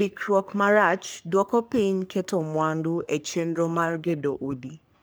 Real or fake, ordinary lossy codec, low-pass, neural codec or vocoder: fake; none; none; codec, 44.1 kHz, 3.4 kbps, Pupu-Codec